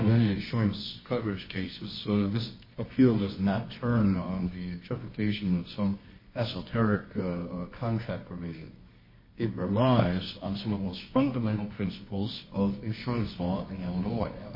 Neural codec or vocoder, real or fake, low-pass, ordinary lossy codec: codec, 24 kHz, 0.9 kbps, WavTokenizer, medium music audio release; fake; 5.4 kHz; MP3, 24 kbps